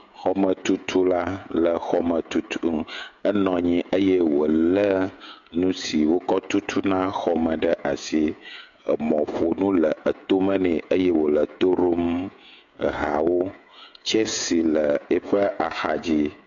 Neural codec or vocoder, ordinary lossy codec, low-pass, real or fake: codec, 16 kHz, 16 kbps, FreqCodec, smaller model; AAC, 64 kbps; 7.2 kHz; fake